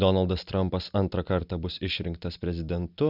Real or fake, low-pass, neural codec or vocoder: real; 5.4 kHz; none